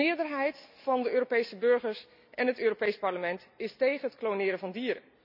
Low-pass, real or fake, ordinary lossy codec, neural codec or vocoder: 5.4 kHz; real; none; none